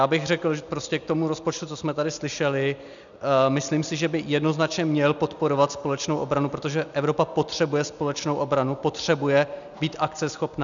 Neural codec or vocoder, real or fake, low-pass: none; real; 7.2 kHz